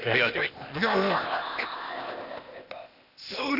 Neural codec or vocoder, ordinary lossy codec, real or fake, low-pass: codec, 16 kHz, 0.8 kbps, ZipCodec; none; fake; 5.4 kHz